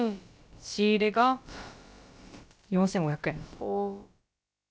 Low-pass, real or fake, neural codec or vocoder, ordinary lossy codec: none; fake; codec, 16 kHz, about 1 kbps, DyCAST, with the encoder's durations; none